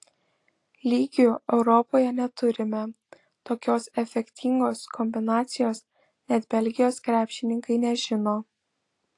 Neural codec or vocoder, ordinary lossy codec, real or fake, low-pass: none; AAC, 48 kbps; real; 10.8 kHz